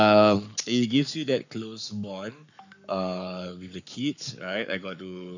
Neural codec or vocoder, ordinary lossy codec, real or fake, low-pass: codec, 44.1 kHz, 7.8 kbps, Pupu-Codec; none; fake; 7.2 kHz